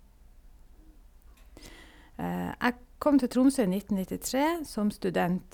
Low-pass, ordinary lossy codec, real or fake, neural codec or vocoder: 19.8 kHz; none; real; none